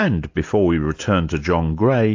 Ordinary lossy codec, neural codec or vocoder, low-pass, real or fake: AAC, 48 kbps; none; 7.2 kHz; real